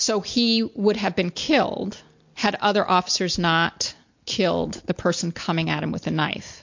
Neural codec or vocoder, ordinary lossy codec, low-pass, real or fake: none; MP3, 48 kbps; 7.2 kHz; real